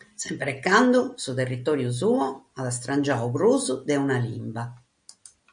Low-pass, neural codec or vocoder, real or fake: 9.9 kHz; none; real